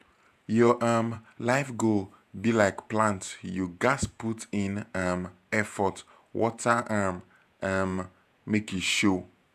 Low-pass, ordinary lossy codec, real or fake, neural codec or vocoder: 14.4 kHz; none; fake; vocoder, 48 kHz, 128 mel bands, Vocos